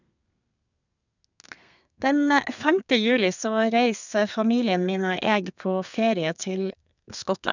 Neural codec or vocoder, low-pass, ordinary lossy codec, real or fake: codec, 32 kHz, 1.9 kbps, SNAC; 7.2 kHz; none; fake